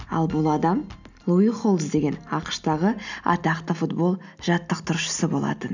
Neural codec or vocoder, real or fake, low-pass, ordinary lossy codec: none; real; 7.2 kHz; none